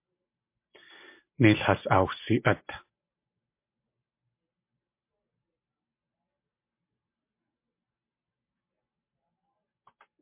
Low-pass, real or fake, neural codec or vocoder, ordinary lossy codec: 3.6 kHz; real; none; MP3, 24 kbps